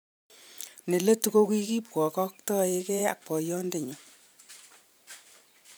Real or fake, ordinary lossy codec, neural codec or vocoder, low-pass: fake; none; vocoder, 44.1 kHz, 128 mel bands every 512 samples, BigVGAN v2; none